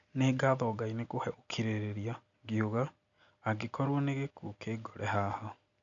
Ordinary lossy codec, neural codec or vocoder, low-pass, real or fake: none; none; 7.2 kHz; real